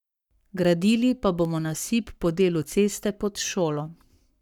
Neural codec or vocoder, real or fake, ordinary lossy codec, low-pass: codec, 44.1 kHz, 7.8 kbps, Pupu-Codec; fake; none; 19.8 kHz